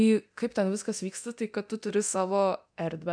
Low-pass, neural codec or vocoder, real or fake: 9.9 kHz; codec, 24 kHz, 0.9 kbps, DualCodec; fake